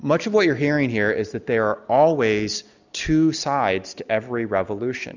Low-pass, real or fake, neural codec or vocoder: 7.2 kHz; real; none